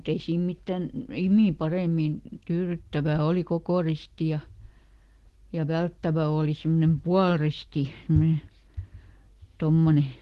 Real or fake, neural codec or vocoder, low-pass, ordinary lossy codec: real; none; 14.4 kHz; Opus, 16 kbps